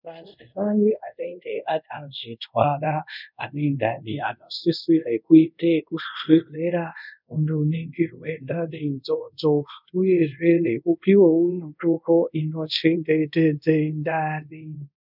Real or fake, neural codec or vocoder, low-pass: fake; codec, 24 kHz, 0.5 kbps, DualCodec; 5.4 kHz